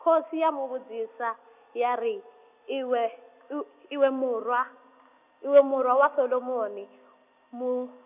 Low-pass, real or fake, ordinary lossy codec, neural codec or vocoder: 3.6 kHz; real; none; none